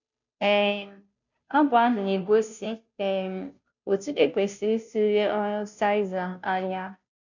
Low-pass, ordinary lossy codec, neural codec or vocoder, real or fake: 7.2 kHz; none; codec, 16 kHz, 0.5 kbps, FunCodec, trained on Chinese and English, 25 frames a second; fake